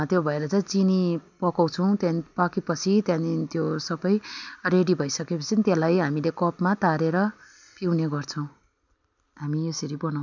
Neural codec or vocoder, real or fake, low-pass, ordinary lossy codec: none; real; 7.2 kHz; none